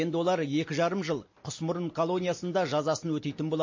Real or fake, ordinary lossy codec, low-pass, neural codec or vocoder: real; MP3, 32 kbps; 7.2 kHz; none